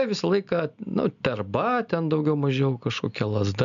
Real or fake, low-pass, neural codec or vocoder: real; 7.2 kHz; none